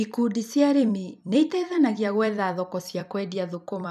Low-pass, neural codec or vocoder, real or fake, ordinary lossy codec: 14.4 kHz; vocoder, 44.1 kHz, 128 mel bands every 256 samples, BigVGAN v2; fake; none